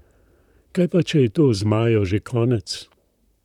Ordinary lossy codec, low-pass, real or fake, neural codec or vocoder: none; 19.8 kHz; fake; vocoder, 44.1 kHz, 128 mel bands, Pupu-Vocoder